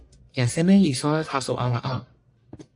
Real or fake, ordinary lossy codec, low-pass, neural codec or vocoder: fake; AAC, 64 kbps; 10.8 kHz; codec, 44.1 kHz, 1.7 kbps, Pupu-Codec